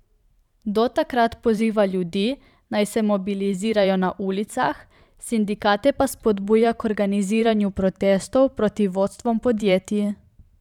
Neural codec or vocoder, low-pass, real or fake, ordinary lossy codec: vocoder, 44.1 kHz, 128 mel bands every 512 samples, BigVGAN v2; 19.8 kHz; fake; none